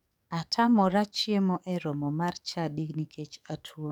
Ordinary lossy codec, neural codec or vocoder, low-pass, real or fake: none; codec, 44.1 kHz, 7.8 kbps, DAC; 19.8 kHz; fake